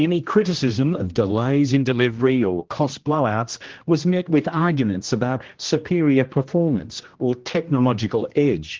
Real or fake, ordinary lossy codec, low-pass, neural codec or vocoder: fake; Opus, 16 kbps; 7.2 kHz; codec, 16 kHz, 1 kbps, X-Codec, HuBERT features, trained on general audio